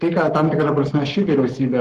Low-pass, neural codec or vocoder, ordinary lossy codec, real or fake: 14.4 kHz; codec, 44.1 kHz, 7.8 kbps, Pupu-Codec; Opus, 16 kbps; fake